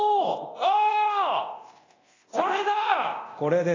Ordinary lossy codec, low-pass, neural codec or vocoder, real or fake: AAC, 32 kbps; 7.2 kHz; codec, 24 kHz, 0.9 kbps, DualCodec; fake